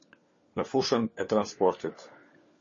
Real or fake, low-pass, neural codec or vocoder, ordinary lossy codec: fake; 7.2 kHz; codec, 16 kHz, 4 kbps, FunCodec, trained on LibriTTS, 50 frames a second; MP3, 32 kbps